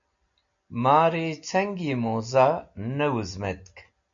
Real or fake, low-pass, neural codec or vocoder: real; 7.2 kHz; none